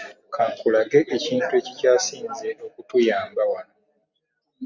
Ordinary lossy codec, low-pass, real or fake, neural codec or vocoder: Opus, 64 kbps; 7.2 kHz; real; none